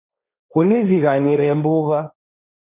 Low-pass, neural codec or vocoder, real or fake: 3.6 kHz; codec, 16 kHz, 1.1 kbps, Voila-Tokenizer; fake